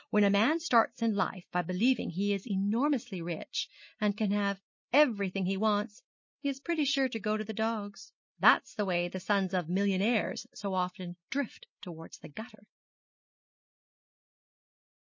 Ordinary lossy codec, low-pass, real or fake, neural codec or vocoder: MP3, 32 kbps; 7.2 kHz; real; none